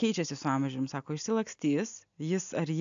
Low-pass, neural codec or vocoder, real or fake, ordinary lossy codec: 7.2 kHz; none; real; AAC, 64 kbps